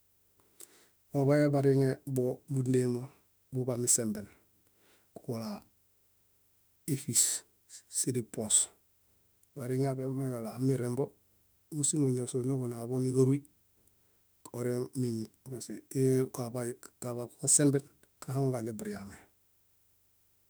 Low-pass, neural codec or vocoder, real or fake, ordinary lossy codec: none; autoencoder, 48 kHz, 32 numbers a frame, DAC-VAE, trained on Japanese speech; fake; none